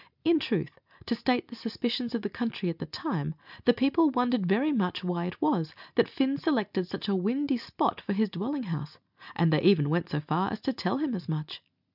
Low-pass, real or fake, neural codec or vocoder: 5.4 kHz; real; none